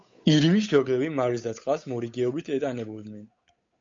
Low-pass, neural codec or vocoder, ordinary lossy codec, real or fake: 7.2 kHz; codec, 16 kHz, 8 kbps, FunCodec, trained on Chinese and English, 25 frames a second; MP3, 48 kbps; fake